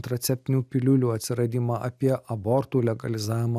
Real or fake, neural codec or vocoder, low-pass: real; none; 14.4 kHz